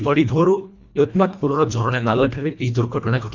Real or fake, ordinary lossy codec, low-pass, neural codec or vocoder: fake; MP3, 64 kbps; 7.2 kHz; codec, 24 kHz, 1.5 kbps, HILCodec